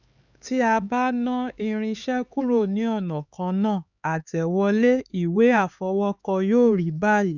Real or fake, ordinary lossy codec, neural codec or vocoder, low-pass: fake; none; codec, 16 kHz, 4 kbps, X-Codec, HuBERT features, trained on LibriSpeech; 7.2 kHz